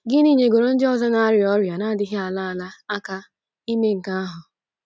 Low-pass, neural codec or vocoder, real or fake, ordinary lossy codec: none; none; real; none